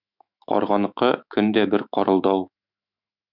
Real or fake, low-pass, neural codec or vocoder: fake; 5.4 kHz; autoencoder, 48 kHz, 128 numbers a frame, DAC-VAE, trained on Japanese speech